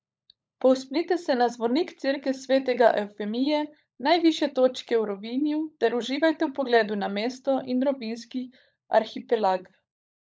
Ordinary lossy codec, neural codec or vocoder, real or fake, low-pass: none; codec, 16 kHz, 16 kbps, FunCodec, trained on LibriTTS, 50 frames a second; fake; none